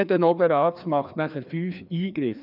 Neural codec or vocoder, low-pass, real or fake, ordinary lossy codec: codec, 16 kHz, 2 kbps, FreqCodec, larger model; 5.4 kHz; fake; none